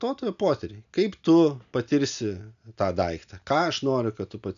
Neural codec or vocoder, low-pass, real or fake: none; 7.2 kHz; real